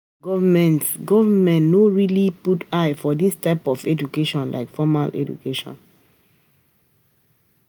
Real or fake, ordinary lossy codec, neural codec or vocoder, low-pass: real; none; none; none